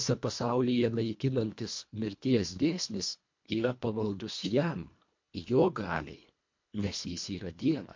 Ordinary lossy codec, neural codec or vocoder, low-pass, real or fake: MP3, 48 kbps; codec, 24 kHz, 1.5 kbps, HILCodec; 7.2 kHz; fake